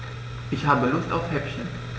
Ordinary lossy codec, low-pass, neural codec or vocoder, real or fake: none; none; none; real